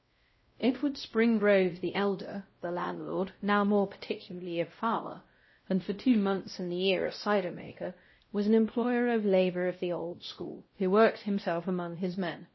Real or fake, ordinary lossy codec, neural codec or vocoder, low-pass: fake; MP3, 24 kbps; codec, 16 kHz, 0.5 kbps, X-Codec, WavLM features, trained on Multilingual LibriSpeech; 7.2 kHz